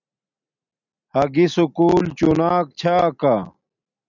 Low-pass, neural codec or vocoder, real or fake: 7.2 kHz; none; real